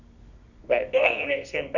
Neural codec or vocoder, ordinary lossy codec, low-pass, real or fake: codec, 24 kHz, 0.9 kbps, WavTokenizer, medium music audio release; none; 7.2 kHz; fake